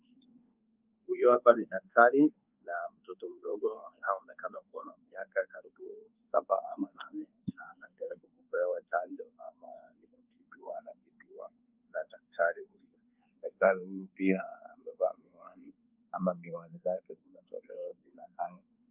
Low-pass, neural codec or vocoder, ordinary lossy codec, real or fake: 3.6 kHz; codec, 24 kHz, 1.2 kbps, DualCodec; Opus, 24 kbps; fake